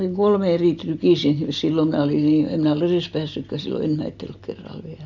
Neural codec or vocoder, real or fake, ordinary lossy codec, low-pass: none; real; none; 7.2 kHz